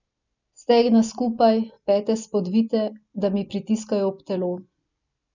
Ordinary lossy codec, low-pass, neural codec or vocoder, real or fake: none; 7.2 kHz; none; real